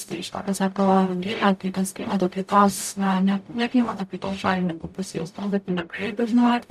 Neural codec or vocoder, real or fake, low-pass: codec, 44.1 kHz, 0.9 kbps, DAC; fake; 14.4 kHz